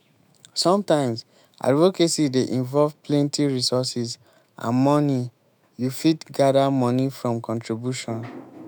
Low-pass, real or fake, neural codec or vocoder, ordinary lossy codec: none; fake; autoencoder, 48 kHz, 128 numbers a frame, DAC-VAE, trained on Japanese speech; none